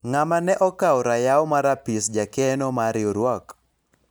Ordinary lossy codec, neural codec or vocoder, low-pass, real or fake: none; none; none; real